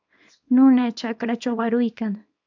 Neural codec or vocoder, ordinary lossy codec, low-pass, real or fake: codec, 24 kHz, 0.9 kbps, WavTokenizer, small release; AAC, 48 kbps; 7.2 kHz; fake